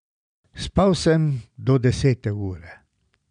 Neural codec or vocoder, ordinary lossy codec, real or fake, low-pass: none; none; real; 9.9 kHz